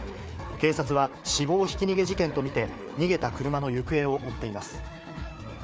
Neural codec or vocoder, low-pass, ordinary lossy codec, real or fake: codec, 16 kHz, 4 kbps, FreqCodec, larger model; none; none; fake